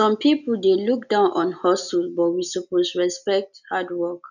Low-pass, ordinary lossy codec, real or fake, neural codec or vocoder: 7.2 kHz; none; real; none